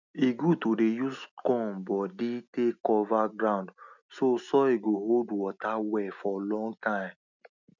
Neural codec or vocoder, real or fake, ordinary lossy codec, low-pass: none; real; none; 7.2 kHz